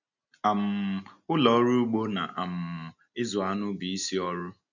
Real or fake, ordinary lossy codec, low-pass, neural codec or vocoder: real; none; 7.2 kHz; none